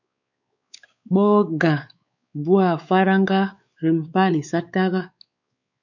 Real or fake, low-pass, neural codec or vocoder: fake; 7.2 kHz; codec, 16 kHz, 4 kbps, X-Codec, WavLM features, trained on Multilingual LibriSpeech